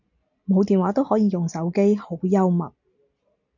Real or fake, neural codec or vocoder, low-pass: real; none; 7.2 kHz